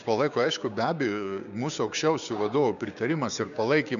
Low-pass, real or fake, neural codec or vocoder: 7.2 kHz; fake; codec, 16 kHz, 6 kbps, DAC